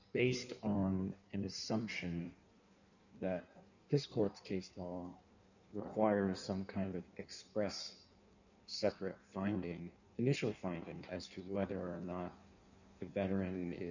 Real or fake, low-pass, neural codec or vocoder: fake; 7.2 kHz; codec, 16 kHz in and 24 kHz out, 1.1 kbps, FireRedTTS-2 codec